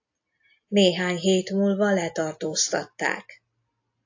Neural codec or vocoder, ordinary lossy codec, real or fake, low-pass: none; AAC, 48 kbps; real; 7.2 kHz